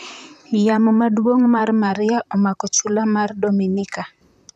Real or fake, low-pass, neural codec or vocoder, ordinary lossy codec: fake; 14.4 kHz; vocoder, 44.1 kHz, 128 mel bands, Pupu-Vocoder; none